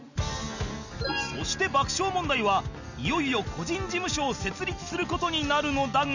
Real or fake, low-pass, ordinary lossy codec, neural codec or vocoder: real; 7.2 kHz; none; none